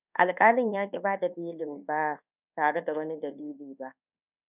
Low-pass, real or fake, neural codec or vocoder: 3.6 kHz; fake; codec, 24 kHz, 1.2 kbps, DualCodec